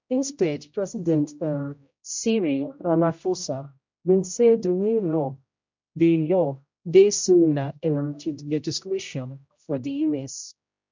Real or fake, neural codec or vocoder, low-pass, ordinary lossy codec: fake; codec, 16 kHz, 0.5 kbps, X-Codec, HuBERT features, trained on general audio; 7.2 kHz; MP3, 64 kbps